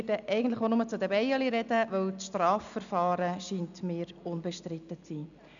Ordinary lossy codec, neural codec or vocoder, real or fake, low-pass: none; none; real; 7.2 kHz